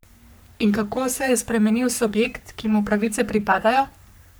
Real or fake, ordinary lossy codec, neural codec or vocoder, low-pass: fake; none; codec, 44.1 kHz, 3.4 kbps, Pupu-Codec; none